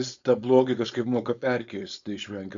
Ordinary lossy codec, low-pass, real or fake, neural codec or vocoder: MP3, 64 kbps; 7.2 kHz; fake; codec, 16 kHz, 4.8 kbps, FACodec